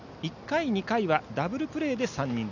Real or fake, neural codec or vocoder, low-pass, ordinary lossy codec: real; none; 7.2 kHz; none